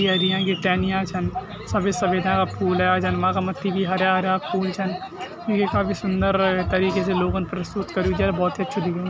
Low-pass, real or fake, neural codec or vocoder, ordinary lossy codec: none; real; none; none